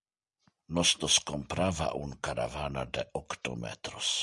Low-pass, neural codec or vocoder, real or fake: 10.8 kHz; none; real